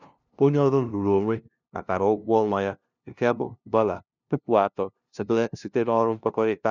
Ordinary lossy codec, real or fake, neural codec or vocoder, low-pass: none; fake; codec, 16 kHz, 0.5 kbps, FunCodec, trained on LibriTTS, 25 frames a second; 7.2 kHz